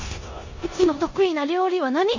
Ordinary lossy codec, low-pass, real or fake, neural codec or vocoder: MP3, 32 kbps; 7.2 kHz; fake; codec, 16 kHz in and 24 kHz out, 0.9 kbps, LongCat-Audio-Codec, four codebook decoder